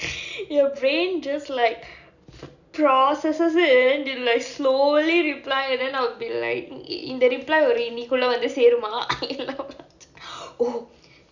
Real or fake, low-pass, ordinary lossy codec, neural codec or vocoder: real; 7.2 kHz; none; none